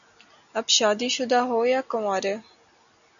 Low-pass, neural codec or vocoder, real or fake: 7.2 kHz; none; real